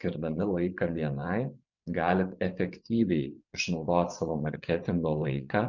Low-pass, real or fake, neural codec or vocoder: 7.2 kHz; fake; vocoder, 24 kHz, 100 mel bands, Vocos